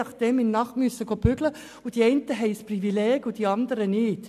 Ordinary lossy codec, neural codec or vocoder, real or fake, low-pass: none; none; real; 14.4 kHz